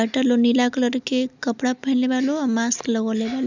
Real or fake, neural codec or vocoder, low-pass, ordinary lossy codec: real; none; 7.2 kHz; none